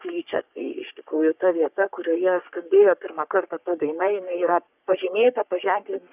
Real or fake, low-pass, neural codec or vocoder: fake; 3.6 kHz; codec, 44.1 kHz, 3.4 kbps, Pupu-Codec